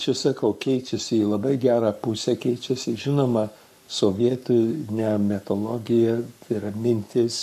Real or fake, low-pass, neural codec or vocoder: fake; 14.4 kHz; codec, 44.1 kHz, 7.8 kbps, Pupu-Codec